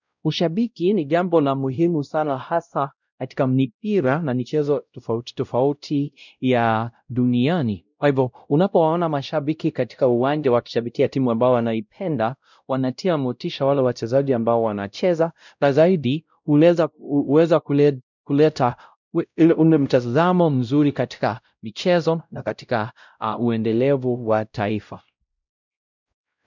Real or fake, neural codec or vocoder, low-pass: fake; codec, 16 kHz, 0.5 kbps, X-Codec, WavLM features, trained on Multilingual LibriSpeech; 7.2 kHz